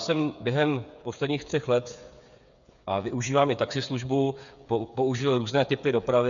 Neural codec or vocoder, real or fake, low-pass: codec, 16 kHz, 8 kbps, FreqCodec, smaller model; fake; 7.2 kHz